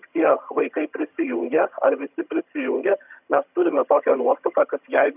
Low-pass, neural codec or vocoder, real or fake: 3.6 kHz; vocoder, 22.05 kHz, 80 mel bands, HiFi-GAN; fake